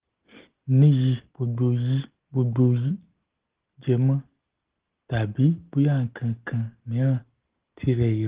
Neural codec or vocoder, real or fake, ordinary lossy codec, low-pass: none; real; Opus, 16 kbps; 3.6 kHz